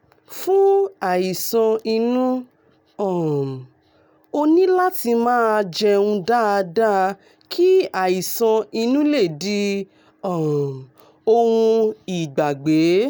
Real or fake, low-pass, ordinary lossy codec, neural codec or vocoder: real; none; none; none